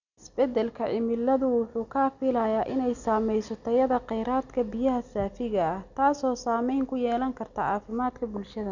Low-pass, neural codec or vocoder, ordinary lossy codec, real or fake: 7.2 kHz; none; none; real